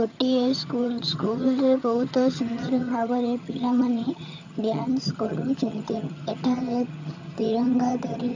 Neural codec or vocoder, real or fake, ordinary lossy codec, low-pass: vocoder, 22.05 kHz, 80 mel bands, HiFi-GAN; fake; AAC, 48 kbps; 7.2 kHz